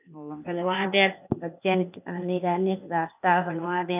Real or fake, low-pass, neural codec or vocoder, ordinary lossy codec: fake; 3.6 kHz; codec, 16 kHz, 0.8 kbps, ZipCodec; none